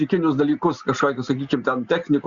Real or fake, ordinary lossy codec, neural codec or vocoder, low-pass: real; Opus, 64 kbps; none; 7.2 kHz